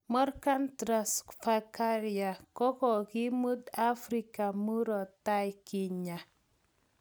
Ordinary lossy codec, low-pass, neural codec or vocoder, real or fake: none; none; none; real